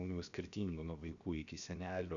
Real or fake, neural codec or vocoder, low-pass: fake; codec, 16 kHz, 0.7 kbps, FocalCodec; 7.2 kHz